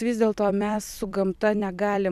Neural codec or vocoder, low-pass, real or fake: vocoder, 44.1 kHz, 128 mel bands every 256 samples, BigVGAN v2; 14.4 kHz; fake